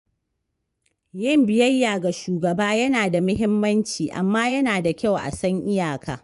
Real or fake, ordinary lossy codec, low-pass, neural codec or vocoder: fake; none; 10.8 kHz; vocoder, 24 kHz, 100 mel bands, Vocos